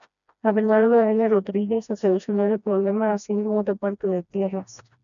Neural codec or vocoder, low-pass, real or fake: codec, 16 kHz, 1 kbps, FreqCodec, smaller model; 7.2 kHz; fake